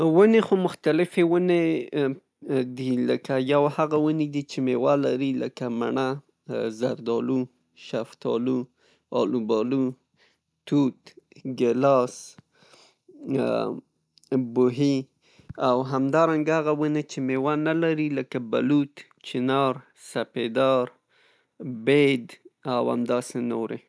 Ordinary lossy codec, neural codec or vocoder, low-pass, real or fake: none; none; none; real